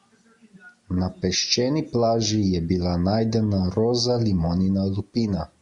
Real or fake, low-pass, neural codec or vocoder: real; 10.8 kHz; none